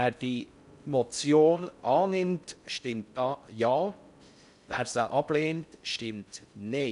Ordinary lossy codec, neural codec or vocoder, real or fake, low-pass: none; codec, 16 kHz in and 24 kHz out, 0.6 kbps, FocalCodec, streaming, 4096 codes; fake; 10.8 kHz